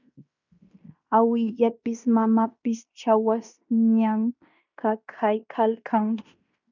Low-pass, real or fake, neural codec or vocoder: 7.2 kHz; fake; codec, 16 kHz in and 24 kHz out, 0.9 kbps, LongCat-Audio-Codec, fine tuned four codebook decoder